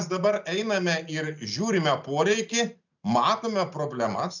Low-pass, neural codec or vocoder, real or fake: 7.2 kHz; none; real